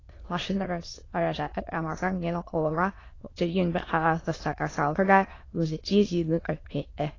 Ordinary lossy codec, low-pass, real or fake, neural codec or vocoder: AAC, 32 kbps; 7.2 kHz; fake; autoencoder, 22.05 kHz, a latent of 192 numbers a frame, VITS, trained on many speakers